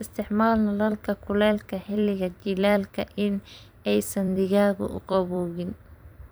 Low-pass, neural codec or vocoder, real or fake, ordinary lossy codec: none; vocoder, 44.1 kHz, 128 mel bands every 256 samples, BigVGAN v2; fake; none